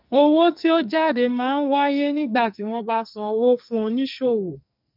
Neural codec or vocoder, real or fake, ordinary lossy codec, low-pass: codec, 44.1 kHz, 2.6 kbps, SNAC; fake; none; 5.4 kHz